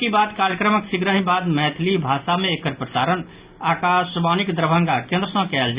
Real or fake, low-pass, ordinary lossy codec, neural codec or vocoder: real; 3.6 kHz; Opus, 64 kbps; none